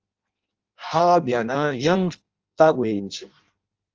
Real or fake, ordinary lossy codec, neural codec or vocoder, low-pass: fake; Opus, 24 kbps; codec, 16 kHz in and 24 kHz out, 0.6 kbps, FireRedTTS-2 codec; 7.2 kHz